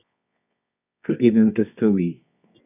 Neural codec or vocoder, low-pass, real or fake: codec, 24 kHz, 0.9 kbps, WavTokenizer, medium music audio release; 3.6 kHz; fake